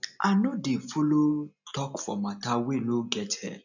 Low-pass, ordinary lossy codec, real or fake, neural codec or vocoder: 7.2 kHz; none; real; none